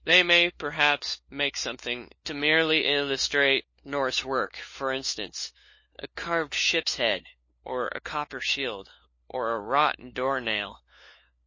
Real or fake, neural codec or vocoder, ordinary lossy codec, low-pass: fake; codec, 16 kHz, 4 kbps, FunCodec, trained on LibriTTS, 50 frames a second; MP3, 32 kbps; 7.2 kHz